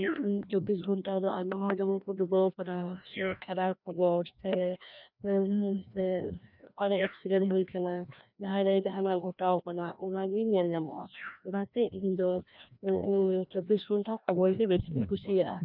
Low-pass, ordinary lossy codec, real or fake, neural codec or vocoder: 5.4 kHz; none; fake; codec, 16 kHz, 1 kbps, FreqCodec, larger model